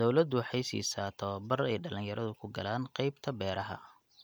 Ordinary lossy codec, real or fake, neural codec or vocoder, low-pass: none; real; none; none